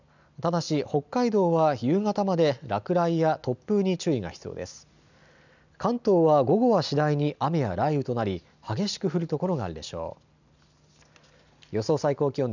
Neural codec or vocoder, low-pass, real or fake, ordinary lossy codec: none; 7.2 kHz; real; none